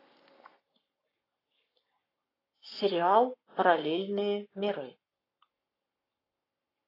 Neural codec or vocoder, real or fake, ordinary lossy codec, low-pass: codec, 44.1 kHz, 7.8 kbps, Pupu-Codec; fake; AAC, 24 kbps; 5.4 kHz